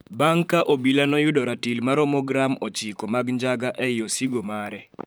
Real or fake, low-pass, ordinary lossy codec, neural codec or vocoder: fake; none; none; vocoder, 44.1 kHz, 128 mel bands, Pupu-Vocoder